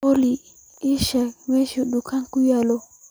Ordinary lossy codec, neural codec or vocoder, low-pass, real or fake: none; none; none; real